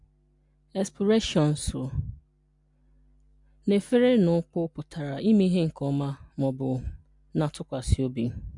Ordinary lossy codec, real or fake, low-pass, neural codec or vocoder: MP3, 64 kbps; real; 10.8 kHz; none